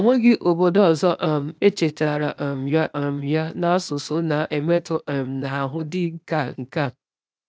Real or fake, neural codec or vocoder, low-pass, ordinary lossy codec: fake; codec, 16 kHz, 0.8 kbps, ZipCodec; none; none